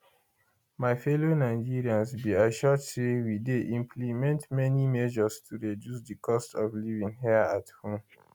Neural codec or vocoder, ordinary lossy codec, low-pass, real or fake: none; none; 19.8 kHz; real